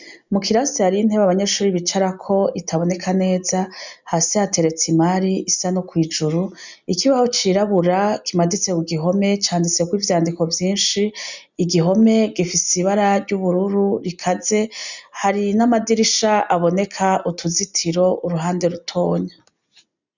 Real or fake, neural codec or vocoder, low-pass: real; none; 7.2 kHz